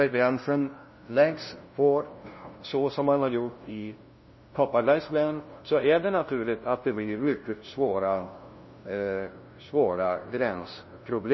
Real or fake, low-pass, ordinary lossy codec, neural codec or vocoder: fake; 7.2 kHz; MP3, 24 kbps; codec, 16 kHz, 0.5 kbps, FunCodec, trained on LibriTTS, 25 frames a second